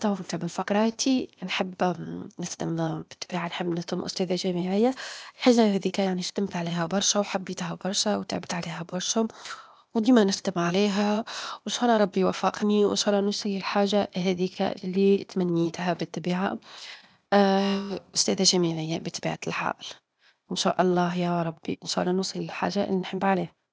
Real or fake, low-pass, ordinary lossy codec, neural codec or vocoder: fake; none; none; codec, 16 kHz, 0.8 kbps, ZipCodec